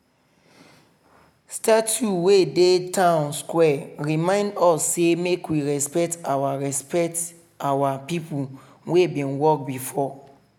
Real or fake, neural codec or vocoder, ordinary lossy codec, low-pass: real; none; none; 19.8 kHz